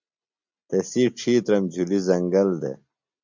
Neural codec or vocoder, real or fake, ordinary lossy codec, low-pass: none; real; MP3, 64 kbps; 7.2 kHz